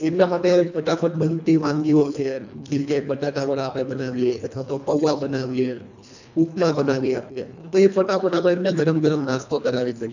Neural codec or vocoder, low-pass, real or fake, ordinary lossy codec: codec, 24 kHz, 1.5 kbps, HILCodec; 7.2 kHz; fake; none